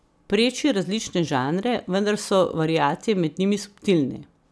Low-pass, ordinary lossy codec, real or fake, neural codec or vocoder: none; none; real; none